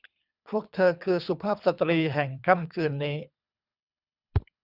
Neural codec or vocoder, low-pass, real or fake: codec, 24 kHz, 3 kbps, HILCodec; 5.4 kHz; fake